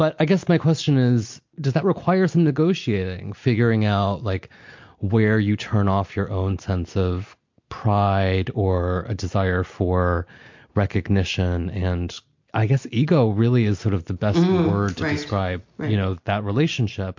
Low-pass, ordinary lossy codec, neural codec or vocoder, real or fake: 7.2 kHz; MP3, 48 kbps; none; real